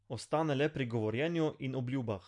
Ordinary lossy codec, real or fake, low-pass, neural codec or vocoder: MP3, 64 kbps; real; 10.8 kHz; none